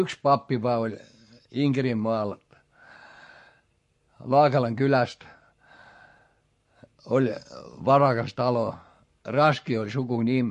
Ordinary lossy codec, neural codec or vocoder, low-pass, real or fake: MP3, 48 kbps; codec, 44.1 kHz, 7.8 kbps, Pupu-Codec; 14.4 kHz; fake